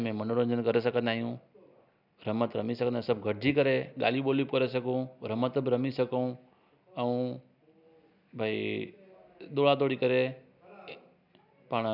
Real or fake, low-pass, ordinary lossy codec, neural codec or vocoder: real; 5.4 kHz; none; none